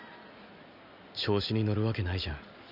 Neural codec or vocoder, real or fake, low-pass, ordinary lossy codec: none; real; 5.4 kHz; none